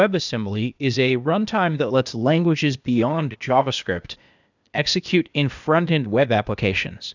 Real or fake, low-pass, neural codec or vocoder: fake; 7.2 kHz; codec, 16 kHz, 0.8 kbps, ZipCodec